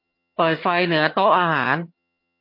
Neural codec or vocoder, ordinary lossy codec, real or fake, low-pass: vocoder, 22.05 kHz, 80 mel bands, HiFi-GAN; MP3, 32 kbps; fake; 5.4 kHz